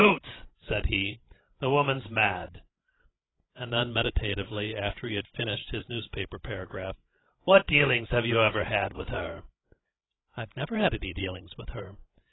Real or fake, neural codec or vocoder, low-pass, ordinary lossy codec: fake; codec, 16 kHz, 16 kbps, FreqCodec, larger model; 7.2 kHz; AAC, 16 kbps